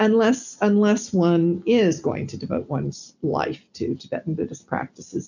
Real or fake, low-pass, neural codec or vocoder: real; 7.2 kHz; none